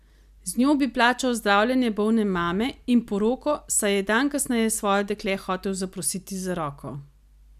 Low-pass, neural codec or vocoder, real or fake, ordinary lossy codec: 14.4 kHz; none; real; none